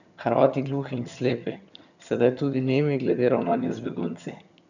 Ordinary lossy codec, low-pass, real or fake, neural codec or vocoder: none; 7.2 kHz; fake; vocoder, 22.05 kHz, 80 mel bands, HiFi-GAN